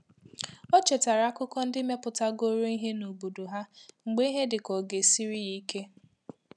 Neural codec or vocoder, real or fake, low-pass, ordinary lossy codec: none; real; none; none